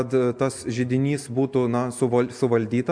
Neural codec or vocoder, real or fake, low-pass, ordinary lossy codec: none; real; 9.9 kHz; MP3, 64 kbps